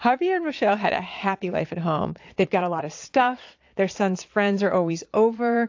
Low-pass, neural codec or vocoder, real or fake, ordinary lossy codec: 7.2 kHz; vocoder, 22.05 kHz, 80 mel bands, Vocos; fake; AAC, 48 kbps